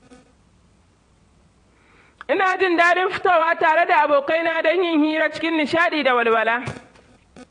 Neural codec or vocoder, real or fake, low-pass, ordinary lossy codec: vocoder, 22.05 kHz, 80 mel bands, WaveNeXt; fake; 9.9 kHz; AAC, 48 kbps